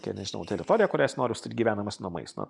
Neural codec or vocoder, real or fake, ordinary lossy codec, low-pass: none; real; MP3, 96 kbps; 10.8 kHz